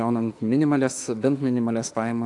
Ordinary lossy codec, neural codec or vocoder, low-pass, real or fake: AAC, 64 kbps; autoencoder, 48 kHz, 32 numbers a frame, DAC-VAE, trained on Japanese speech; 10.8 kHz; fake